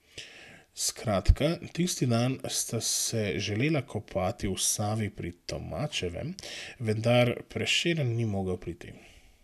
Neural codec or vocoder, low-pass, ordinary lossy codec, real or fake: none; 14.4 kHz; none; real